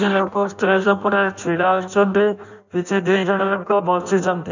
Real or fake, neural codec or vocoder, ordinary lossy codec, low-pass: fake; codec, 16 kHz in and 24 kHz out, 0.6 kbps, FireRedTTS-2 codec; none; 7.2 kHz